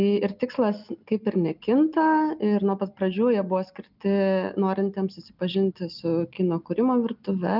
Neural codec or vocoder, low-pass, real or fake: none; 5.4 kHz; real